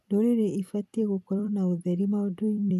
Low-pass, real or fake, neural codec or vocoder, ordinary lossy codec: 14.4 kHz; fake; vocoder, 44.1 kHz, 128 mel bands every 256 samples, BigVGAN v2; none